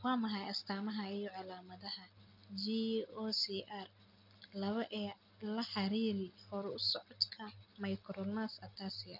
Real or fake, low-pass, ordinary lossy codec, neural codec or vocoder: real; 5.4 kHz; none; none